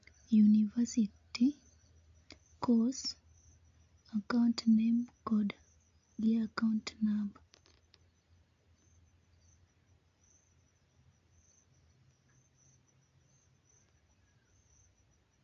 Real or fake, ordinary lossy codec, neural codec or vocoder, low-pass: real; AAC, 48 kbps; none; 7.2 kHz